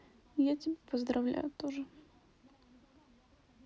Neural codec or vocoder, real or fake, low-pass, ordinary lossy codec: none; real; none; none